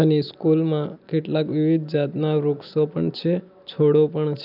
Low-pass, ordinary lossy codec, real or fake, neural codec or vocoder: 5.4 kHz; none; real; none